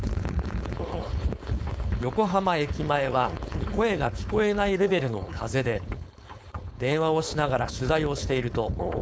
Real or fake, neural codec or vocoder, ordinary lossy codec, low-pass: fake; codec, 16 kHz, 4.8 kbps, FACodec; none; none